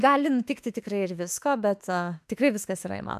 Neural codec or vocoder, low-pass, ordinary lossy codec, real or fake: autoencoder, 48 kHz, 32 numbers a frame, DAC-VAE, trained on Japanese speech; 14.4 kHz; AAC, 96 kbps; fake